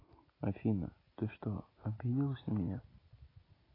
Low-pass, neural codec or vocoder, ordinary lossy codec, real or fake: 5.4 kHz; none; AAC, 24 kbps; real